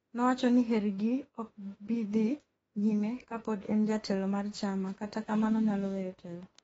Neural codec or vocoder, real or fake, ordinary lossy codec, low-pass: autoencoder, 48 kHz, 32 numbers a frame, DAC-VAE, trained on Japanese speech; fake; AAC, 24 kbps; 19.8 kHz